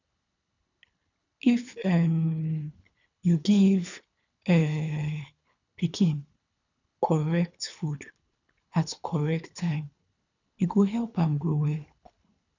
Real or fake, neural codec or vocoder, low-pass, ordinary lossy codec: fake; codec, 24 kHz, 3 kbps, HILCodec; 7.2 kHz; none